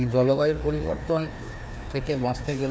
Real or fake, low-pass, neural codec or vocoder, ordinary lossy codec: fake; none; codec, 16 kHz, 2 kbps, FreqCodec, larger model; none